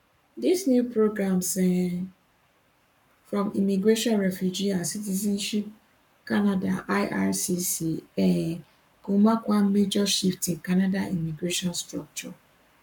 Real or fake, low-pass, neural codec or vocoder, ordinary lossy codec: fake; 19.8 kHz; codec, 44.1 kHz, 7.8 kbps, Pupu-Codec; none